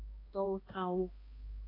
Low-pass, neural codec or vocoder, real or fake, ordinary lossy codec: 5.4 kHz; codec, 16 kHz, 1 kbps, X-Codec, HuBERT features, trained on balanced general audio; fake; AAC, 24 kbps